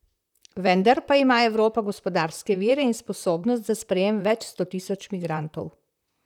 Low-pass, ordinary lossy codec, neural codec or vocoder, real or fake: 19.8 kHz; none; vocoder, 44.1 kHz, 128 mel bands, Pupu-Vocoder; fake